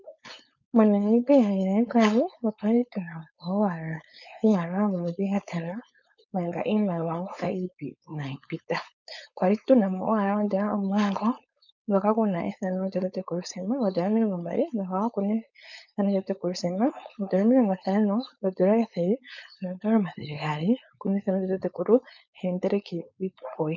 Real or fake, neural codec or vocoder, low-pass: fake; codec, 16 kHz, 4.8 kbps, FACodec; 7.2 kHz